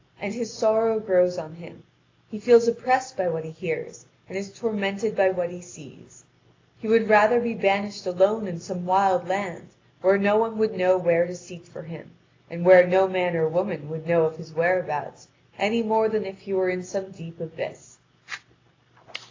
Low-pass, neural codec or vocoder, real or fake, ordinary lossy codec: 7.2 kHz; none; real; AAC, 32 kbps